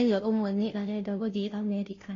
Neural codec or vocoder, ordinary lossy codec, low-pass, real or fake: codec, 16 kHz, 0.5 kbps, FunCodec, trained on Chinese and English, 25 frames a second; AAC, 32 kbps; 7.2 kHz; fake